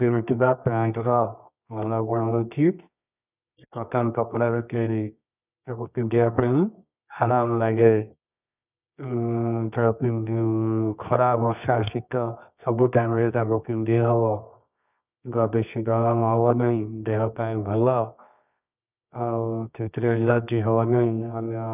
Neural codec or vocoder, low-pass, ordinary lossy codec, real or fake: codec, 24 kHz, 0.9 kbps, WavTokenizer, medium music audio release; 3.6 kHz; none; fake